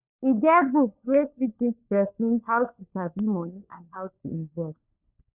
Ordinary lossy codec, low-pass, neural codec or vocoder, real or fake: none; 3.6 kHz; codec, 16 kHz, 4 kbps, FunCodec, trained on LibriTTS, 50 frames a second; fake